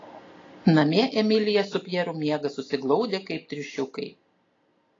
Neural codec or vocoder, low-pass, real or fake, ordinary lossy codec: none; 7.2 kHz; real; AAC, 32 kbps